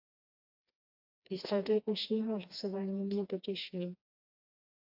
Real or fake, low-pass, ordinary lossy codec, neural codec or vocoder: fake; 5.4 kHz; AAC, 48 kbps; codec, 16 kHz, 2 kbps, FreqCodec, smaller model